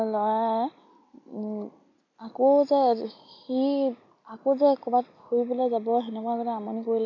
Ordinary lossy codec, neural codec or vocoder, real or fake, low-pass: none; none; real; 7.2 kHz